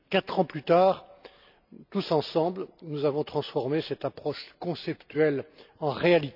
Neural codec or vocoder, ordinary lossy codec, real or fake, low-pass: none; none; real; 5.4 kHz